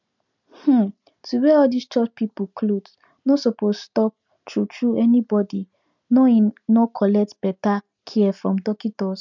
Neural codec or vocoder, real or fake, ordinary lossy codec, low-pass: none; real; none; 7.2 kHz